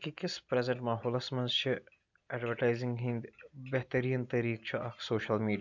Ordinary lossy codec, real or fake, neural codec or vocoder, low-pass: none; real; none; 7.2 kHz